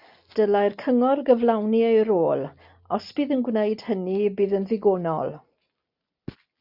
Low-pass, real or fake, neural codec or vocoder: 5.4 kHz; real; none